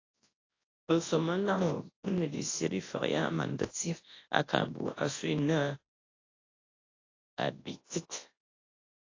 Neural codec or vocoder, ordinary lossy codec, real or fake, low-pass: codec, 24 kHz, 0.9 kbps, WavTokenizer, large speech release; AAC, 32 kbps; fake; 7.2 kHz